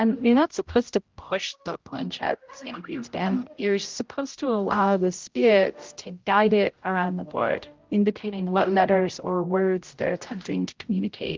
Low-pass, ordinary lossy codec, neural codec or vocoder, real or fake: 7.2 kHz; Opus, 32 kbps; codec, 16 kHz, 0.5 kbps, X-Codec, HuBERT features, trained on general audio; fake